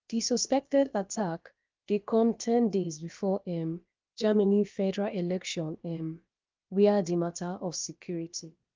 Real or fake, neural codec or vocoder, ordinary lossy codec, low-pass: fake; codec, 16 kHz, about 1 kbps, DyCAST, with the encoder's durations; Opus, 24 kbps; 7.2 kHz